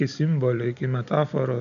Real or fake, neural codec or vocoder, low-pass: real; none; 7.2 kHz